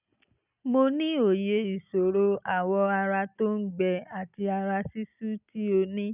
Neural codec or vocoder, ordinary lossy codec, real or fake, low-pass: none; none; real; 3.6 kHz